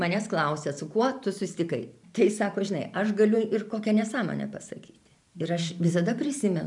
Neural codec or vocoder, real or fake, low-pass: vocoder, 44.1 kHz, 128 mel bands every 512 samples, BigVGAN v2; fake; 10.8 kHz